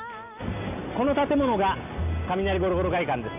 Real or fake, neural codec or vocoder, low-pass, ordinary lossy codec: real; none; 3.6 kHz; none